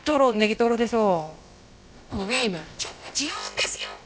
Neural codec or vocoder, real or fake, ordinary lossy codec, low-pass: codec, 16 kHz, about 1 kbps, DyCAST, with the encoder's durations; fake; none; none